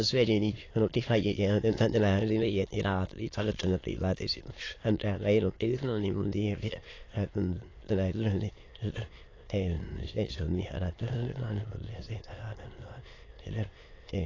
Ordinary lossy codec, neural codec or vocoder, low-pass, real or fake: AAC, 32 kbps; autoencoder, 22.05 kHz, a latent of 192 numbers a frame, VITS, trained on many speakers; 7.2 kHz; fake